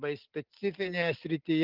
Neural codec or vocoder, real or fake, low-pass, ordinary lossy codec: none; real; 5.4 kHz; Opus, 24 kbps